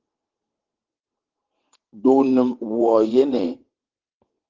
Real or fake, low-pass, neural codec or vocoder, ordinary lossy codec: fake; 7.2 kHz; vocoder, 22.05 kHz, 80 mel bands, WaveNeXt; Opus, 16 kbps